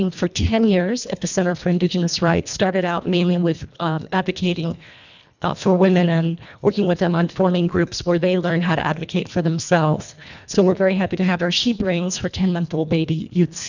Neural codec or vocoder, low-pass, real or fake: codec, 24 kHz, 1.5 kbps, HILCodec; 7.2 kHz; fake